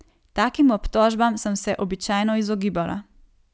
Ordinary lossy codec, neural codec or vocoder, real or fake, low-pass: none; none; real; none